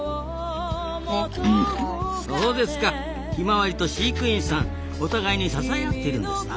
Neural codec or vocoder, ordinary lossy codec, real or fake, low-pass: none; none; real; none